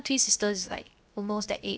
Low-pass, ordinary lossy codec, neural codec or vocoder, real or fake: none; none; codec, 16 kHz, 0.7 kbps, FocalCodec; fake